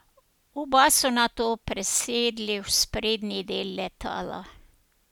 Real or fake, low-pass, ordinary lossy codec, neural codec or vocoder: real; 19.8 kHz; none; none